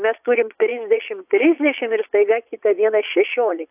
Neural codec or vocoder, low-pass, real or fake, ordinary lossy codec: vocoder, 22.05 kHz, 80 mel bands, Vocos; 3.6 kHz; fake; AAC, 32 kbps